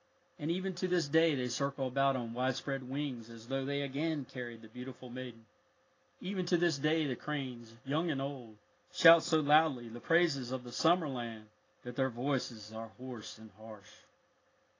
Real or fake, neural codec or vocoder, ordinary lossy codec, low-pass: real; none; AAC, 32 kbps; 7.2 kHz